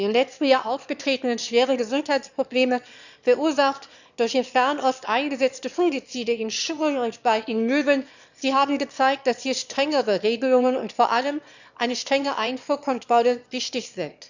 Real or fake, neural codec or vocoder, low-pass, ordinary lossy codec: fake; autoencoder, 22.05 kHz, a latent of 192 numbers a frame, VITS, trained on one speaker; 7.2 kHz; none